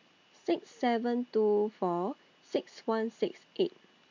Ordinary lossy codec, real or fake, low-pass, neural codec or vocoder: MP3, 48 kbps; real; 7.2 kHz; none